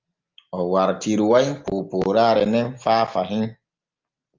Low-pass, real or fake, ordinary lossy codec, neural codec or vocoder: 7.2 kHz; real; Opus, 24 kbps; none